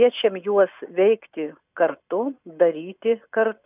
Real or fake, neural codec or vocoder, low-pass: real; none; 3.6 kHz